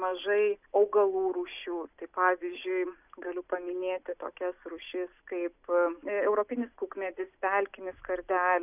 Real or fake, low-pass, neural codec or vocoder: real; 3.6 kHz; none